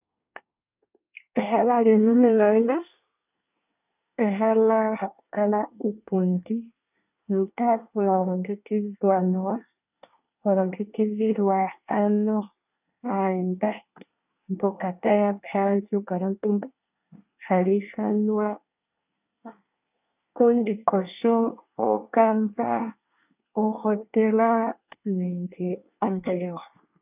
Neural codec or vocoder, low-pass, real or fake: codec, 24 kHz, 1 kbps, SNAC; 3.6 kHz; fake